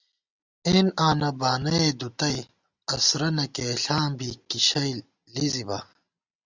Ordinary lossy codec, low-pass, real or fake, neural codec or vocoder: Opus, 64 kbps; 7.2 kHz; real; none